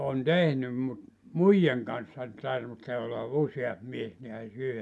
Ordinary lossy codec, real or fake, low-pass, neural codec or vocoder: none; real; none; none